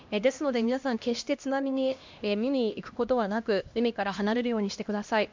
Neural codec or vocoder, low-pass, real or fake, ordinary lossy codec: codec, 16 kHz, 1 kbps, X-Codec, HuBERT features, trained on LibriSpeech; 7.2 kHz; fake; MP3, 64 kbps